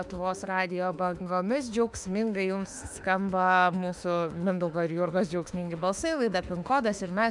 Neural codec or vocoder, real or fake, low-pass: autoencoder, 48 kHz, 32 numbers a frame, DAC-VAE, trained on Japanese speech; fake; 10.8 kHz